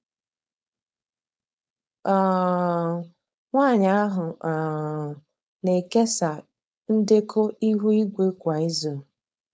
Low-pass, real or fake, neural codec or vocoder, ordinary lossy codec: none; fake; codec, 16 kHz, 4.8 kbps, FACodec; none